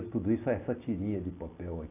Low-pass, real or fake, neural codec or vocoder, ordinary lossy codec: 3.6 kHz; real; none; AAC, 24 kbps